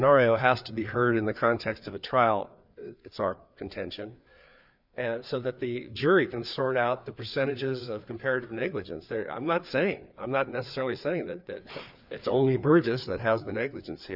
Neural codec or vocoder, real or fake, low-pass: codec, 16 kHz, 4 kbps, FreqCodec, larger model; fake; 5.4 kHz